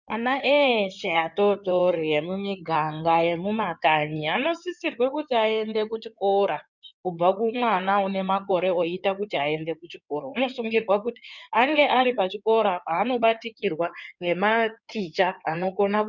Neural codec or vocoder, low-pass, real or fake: codec, 16 kHz in and 24 kHz out, 2.2 kbps, FireRedTTS-2 codec; 7.2 kHz; fake